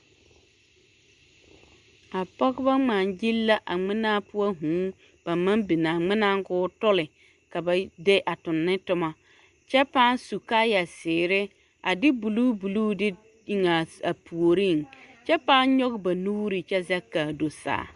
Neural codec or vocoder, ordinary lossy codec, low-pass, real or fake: none; Opus, 64 kbps; 10.8 kHz; real